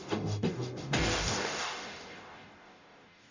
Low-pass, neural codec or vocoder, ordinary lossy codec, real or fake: 7.2 kHz; codec, 44.1 kHz, 0.9 kbps, DAC; Opus, 64 kbps; fake